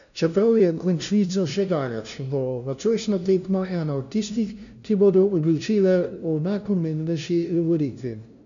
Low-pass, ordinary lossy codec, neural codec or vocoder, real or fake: 7.2 kHz; none; codec, 16 kHz, 0.5 kbps, FunCodec, trained on LibriTTS, 25 frames a second; fake